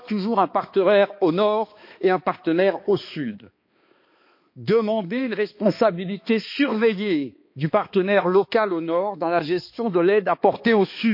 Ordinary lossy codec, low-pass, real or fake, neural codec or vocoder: MP3, 32 kbps; 5.4 kHz; fake; codec, 16 kHz, 2 kbps, X-Codec, HuBERT features, trained on balanced general audio